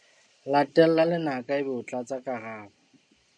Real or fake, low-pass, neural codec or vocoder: real; 9.9 kHz; none